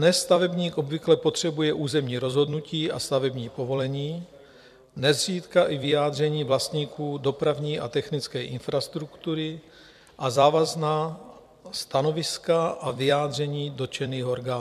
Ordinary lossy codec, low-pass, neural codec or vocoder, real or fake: MP3, 96 kbps; 14.4 kHz; vocoder, 44.1 kHz, 128 mel bands every 256 samples, BigVGAN v2; fake